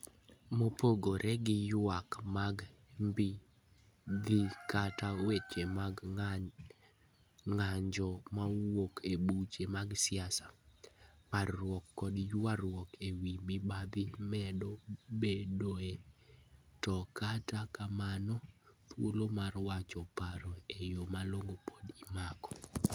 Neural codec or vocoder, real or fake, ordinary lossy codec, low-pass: none; real; none; none